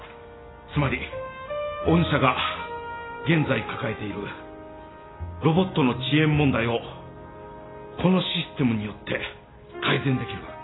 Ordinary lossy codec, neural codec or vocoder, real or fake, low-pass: AAC, 16 kbps; none; real; 7.2 kHz